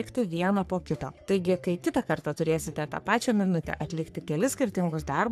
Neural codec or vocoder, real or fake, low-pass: codec, 44.1 kHz, 3.4 kbps, Pupu-Codec; fake; 14.4 kHz